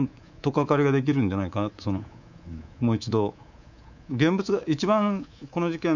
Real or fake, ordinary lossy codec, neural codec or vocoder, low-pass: fake; none; codec, 24 kHz, 3.1 kbps, DualCodec; 7.2 kHz